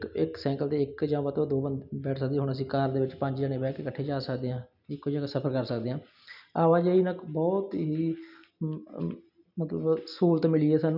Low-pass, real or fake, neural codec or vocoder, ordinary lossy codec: 5.4 kHz; real; none; none